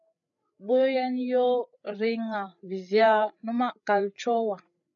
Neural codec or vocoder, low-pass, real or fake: codec, 16 kHz, 8 kbps, FreqCodec, larger model; 7.2 kHz; fake